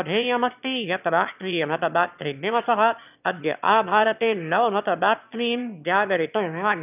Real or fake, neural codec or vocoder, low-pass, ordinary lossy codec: fake; autoencoder, 22.05 kHz, a latent of 192 numbers a frame, VITS, trained on one speaker; 3.6 kHz; none